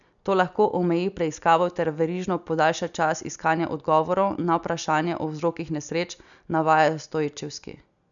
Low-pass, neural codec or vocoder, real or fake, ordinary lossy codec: 7.2 kHz; none; real; none